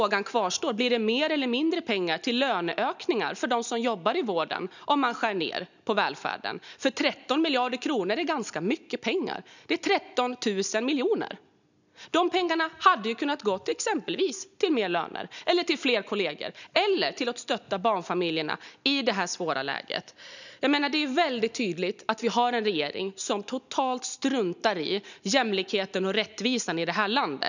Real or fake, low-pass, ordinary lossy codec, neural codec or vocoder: real; 7.2 kHz; none; none